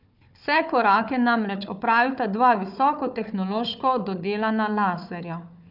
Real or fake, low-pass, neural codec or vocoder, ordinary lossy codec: fake; 5.4 kHz; codec, 16 kHz, 4 kbps, FunCodec, trained on Chinese and English, 50 frames a second; none